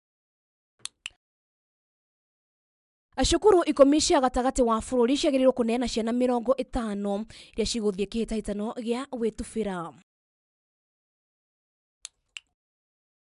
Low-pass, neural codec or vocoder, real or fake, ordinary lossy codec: 10.8 kHz; none; real; none